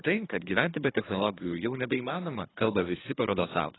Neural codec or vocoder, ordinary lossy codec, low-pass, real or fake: codec, 24 kHz, 3 kbps, HILCodec; AAC, 16 kbps; 7.2 kHz; fake